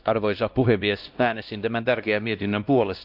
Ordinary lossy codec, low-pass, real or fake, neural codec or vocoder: Opus, 24 kbps; 5.4 kHz; fake; codec, 16 kHz, 1 kbps, X-Codec, WavLM features, trained on Multilingual LibriSpeech